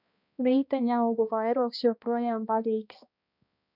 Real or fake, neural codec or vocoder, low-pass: fake; codec, 16 kHz, 1 kbps, X-Codec, HuBERT features, trained on balanced general audio; 5.4 kHz